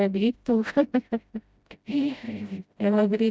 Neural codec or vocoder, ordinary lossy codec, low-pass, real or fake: codec, 16 kHz, 0.5 kbps, FreqCodec, smaller model; none; none; fake